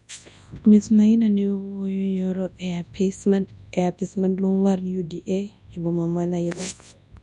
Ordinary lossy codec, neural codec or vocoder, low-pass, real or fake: none; codec, 24 kHz, 0.9 kbps, WavTokenizer, large speech release; 10.8 kHz; fake